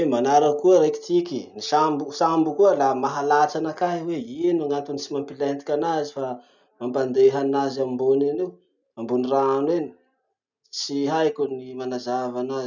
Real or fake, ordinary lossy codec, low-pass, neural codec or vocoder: real; none; 7.2 kHz; none